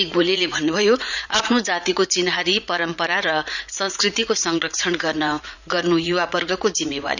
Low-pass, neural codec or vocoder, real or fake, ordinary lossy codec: 7.2 kHz; vocoder, 44.1 kHz, 80 mel bands, Vocos; fake; none